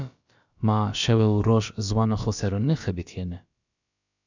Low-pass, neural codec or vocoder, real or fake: 7.2 kHz; codec, 16 kHz, about 1 kbps, DyCAST, with the encoder's durations; fake